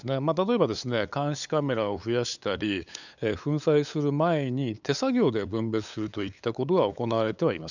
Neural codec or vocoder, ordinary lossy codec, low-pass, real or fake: codec, 16 kHz, 8 kbps, FunCodec, trained on LibriTTS, 25 frames a second; none; 7.2 kHz; fake